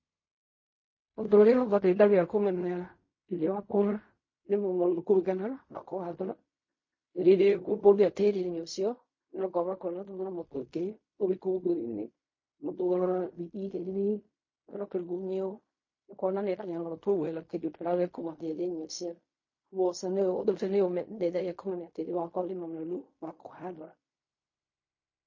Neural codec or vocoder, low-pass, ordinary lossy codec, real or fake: codec, 16 kHz in and 24 kHz out, 0.4 kbps, LongCat-Audio-Codec, fine tuned four codebook decoder; 7.2 kHz; MP3, 32 kbps; fake